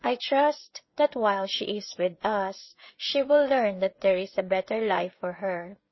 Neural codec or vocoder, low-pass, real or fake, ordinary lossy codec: codec, 16 kHz, 16 kbps, FreqCodec, smaller model; 7.2 kHz; fake; MP3, 24 kbps